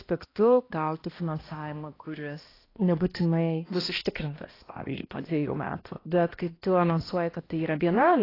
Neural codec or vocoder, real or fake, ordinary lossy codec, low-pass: codec, 16 kHz, 1 kbps, X-Codec, HuBERT features, trained on balanced general audio; fake; AAC, 24 kbps; 5.4 kHz